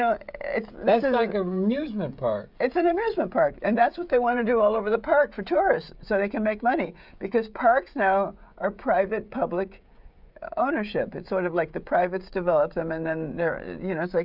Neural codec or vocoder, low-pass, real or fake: codec, 16 kHz, 16 kbps, FreqCodec, smaller model; 5.4 kHz; fake